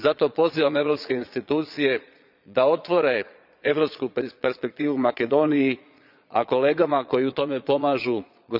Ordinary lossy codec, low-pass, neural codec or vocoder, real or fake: none; 5.4 kHz; vocoder, 22.05 kHz, 80 mel bands, Vocos; fake